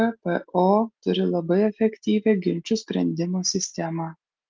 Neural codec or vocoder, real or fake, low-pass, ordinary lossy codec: none; real; 7.2 kHz; Opus, 32 kbps